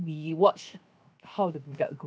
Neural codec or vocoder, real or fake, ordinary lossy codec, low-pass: codec, 16 kHz, 0.7 kbps, FocalCodec; fake; none; none